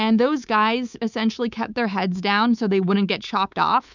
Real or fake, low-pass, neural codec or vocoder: fake; 7.2 kHz; codec, 24 kHz, 3.1 kbps, DualCodec